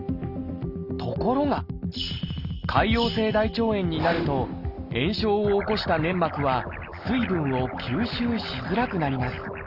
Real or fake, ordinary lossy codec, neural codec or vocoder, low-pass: real; Opus, 32 kbps; none; 5.4 kHz